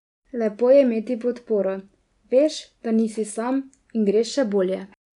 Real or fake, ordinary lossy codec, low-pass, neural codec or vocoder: real; none; 10.8 kHz; none